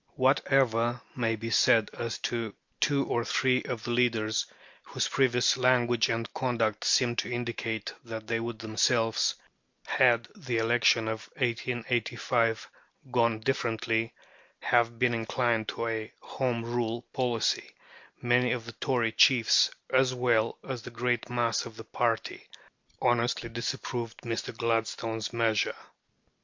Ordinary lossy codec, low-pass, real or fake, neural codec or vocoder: MP3, 48 kbps; 7.2 kHz; real; none